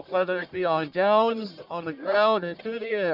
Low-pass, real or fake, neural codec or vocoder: 5.4 kHz; fake; codec, 44.1 kHz, 1.7 kbps, Pupu-Codec